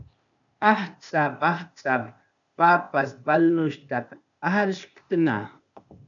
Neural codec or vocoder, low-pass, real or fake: codec, 16 kHz, 0.8 kbps, ZipCodec; 7.2 kHz; fake